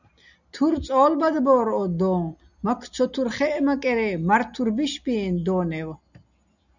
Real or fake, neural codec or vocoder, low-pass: real; none; 7.2 kHz